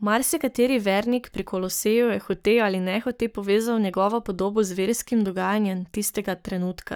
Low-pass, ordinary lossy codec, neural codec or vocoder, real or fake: none; none; codec, 44.1 kHz, 7.8 kbps, Pupu-Codec; fake